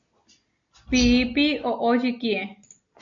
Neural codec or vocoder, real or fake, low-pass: none; real; 7.2 kHz